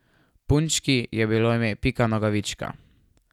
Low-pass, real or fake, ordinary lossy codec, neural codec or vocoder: 19.8 kHz; real; none; none